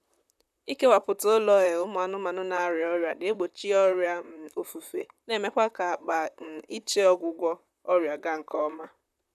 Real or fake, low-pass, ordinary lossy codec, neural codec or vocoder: fake; 14.4 kHz; none; vocoder, 44.1 kHz, 128 mel bands, Pupu-Vocoder